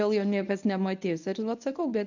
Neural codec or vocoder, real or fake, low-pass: codec, 24 kHz, 0.9 kbps, WavTokenizer, medium speech release version 1; fake; 7.2 kHz